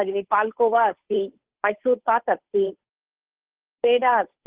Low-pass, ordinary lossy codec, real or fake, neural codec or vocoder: 3.6 kHz; Opus, 16 kbps; fake; codec, 16 kHz, 8 kbps, FunCodec, trained on Chinese and English, 25 frames a second